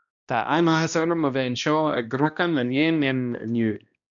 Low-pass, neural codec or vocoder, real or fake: 7.2 kHz; codec, 16 kHz, 1 kbps, X-Codec, HuBERT features, trained on balanced general audio; fake